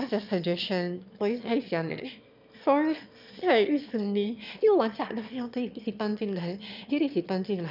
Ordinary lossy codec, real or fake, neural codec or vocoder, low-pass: none; fake; autoencoder, 22.05 kHz, a latent of 192 numbers a frame, VITS, trained on one speaker; 5.4 kHz